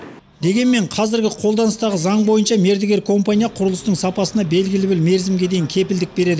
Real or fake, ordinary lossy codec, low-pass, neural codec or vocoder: real; none; none; none